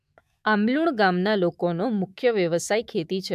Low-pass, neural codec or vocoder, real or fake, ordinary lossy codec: 14.4 kHz; autoencoder, 48 kHz, 128 numbers a frame, DAC-VAE, trained on Japanese speech; fake; AAC, 96 kbps